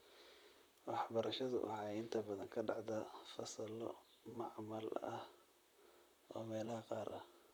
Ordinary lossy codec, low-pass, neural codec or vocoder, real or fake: none; none; vocoder, 44.1 kHz, 128 mel bands, Pupu-Vocoder; fake